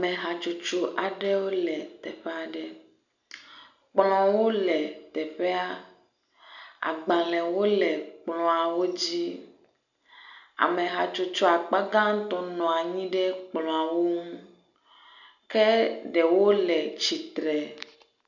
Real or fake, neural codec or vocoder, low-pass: real; none; 7.2 kHz